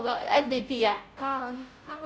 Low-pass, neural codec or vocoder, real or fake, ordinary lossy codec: none; codec, 16 kHz, 0.5 kbps, FunCodec, trained on Chinese and English, 25 frames a second; fake; none